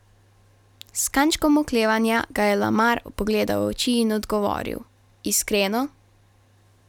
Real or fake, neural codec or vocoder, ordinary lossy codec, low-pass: real; none; none; 19.8 kHz